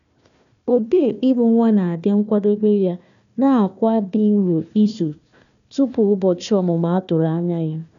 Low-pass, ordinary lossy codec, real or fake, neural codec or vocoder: 7.2 kHz; none; fake; codec, 16 kHz, 1 kbps, FunCodec, trained on Chinese and English, 50 frames a second